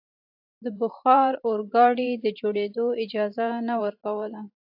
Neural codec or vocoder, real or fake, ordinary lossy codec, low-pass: vocoder, 44.1 kHz, 128 mel bands every 512 samples, BigVGAN v2; fake; AAC, 48 kbps; 5.4 kHz